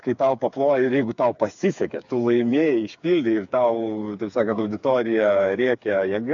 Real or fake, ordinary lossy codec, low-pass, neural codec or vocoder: fake; AAC, 64 kbps; 7.2 kHz; codec, 16 kHz, 4 kbps, FreqCodec, smaller model